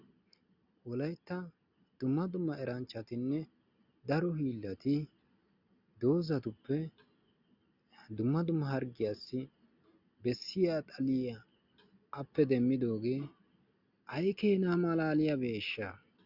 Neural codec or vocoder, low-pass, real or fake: none; 5.4 kHz; real